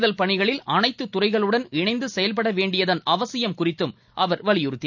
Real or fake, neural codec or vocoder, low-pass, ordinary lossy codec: real; none; 7.2 kHz; none